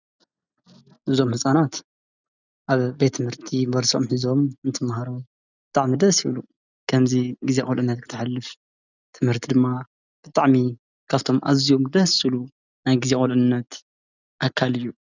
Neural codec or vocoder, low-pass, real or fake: none; 7.2 kHz; real